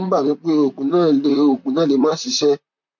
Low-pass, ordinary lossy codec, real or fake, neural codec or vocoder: 7.2 kHz; MP3, 48 kbps; fake; vocoder, 44.1 kHz, 128 mel bands, Pupu-Vocoder